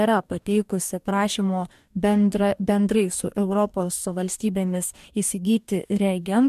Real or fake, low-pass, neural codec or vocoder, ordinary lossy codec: fake; 14.4 kHz; codec, 44.1 kHz, 2.6 kbps, DAC; MP3, 96 kbps